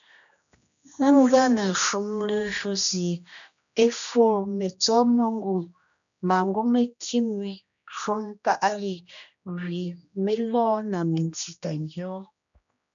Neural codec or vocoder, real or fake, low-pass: codec, 16 kHz, 1 kbps, X-Codec, HuBERT features, trained on general audio; fake; 7.2 kHz